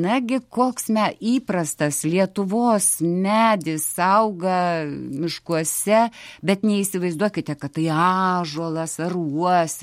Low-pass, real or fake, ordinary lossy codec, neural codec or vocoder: 19.8 kHz; real; MP3, 64 kbps; none